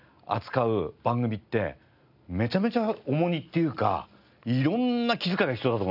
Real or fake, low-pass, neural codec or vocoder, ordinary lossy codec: real; 5.4 kHz; none; none